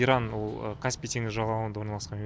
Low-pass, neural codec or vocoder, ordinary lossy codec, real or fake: none; none; none; real